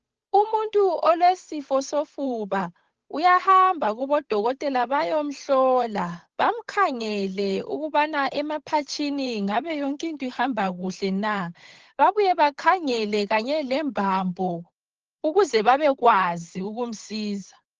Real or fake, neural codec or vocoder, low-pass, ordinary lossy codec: fake; codec, 16 kHz, 8 kbps, FunCodec, trained on Chinese and English, 25 frames a second; 7.2 kHz; Opus, 16 kbps